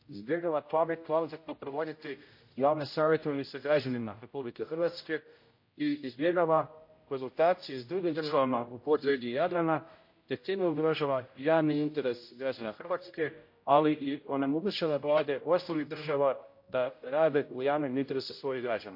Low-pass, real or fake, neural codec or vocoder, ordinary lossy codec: 5.4 kHz; fake; codec, 16 kHz, 0.5 kbps, X-Codec, HuBERT features, trained on general audio; MP3, 32 kbps